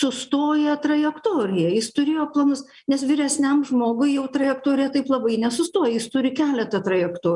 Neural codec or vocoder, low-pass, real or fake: none; 10.8 kHz; real